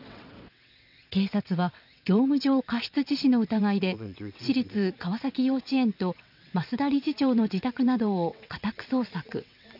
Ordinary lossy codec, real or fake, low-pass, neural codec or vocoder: none; fake; 5.4 kHz; vocoder, 22.05 kHz, 80 mel bands, WaveNeXt